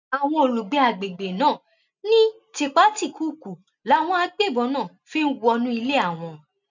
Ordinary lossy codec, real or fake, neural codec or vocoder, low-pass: none; real; none; 7.2 kHz